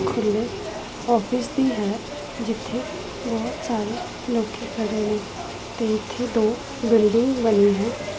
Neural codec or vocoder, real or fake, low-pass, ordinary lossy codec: none; real; none; none